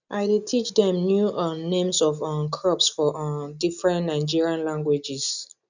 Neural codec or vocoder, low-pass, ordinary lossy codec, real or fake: none; 7.2 kHz; none; real